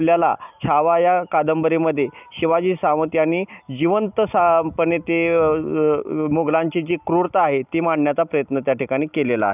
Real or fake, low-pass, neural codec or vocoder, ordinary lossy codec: real; 3.6 kHz; none; none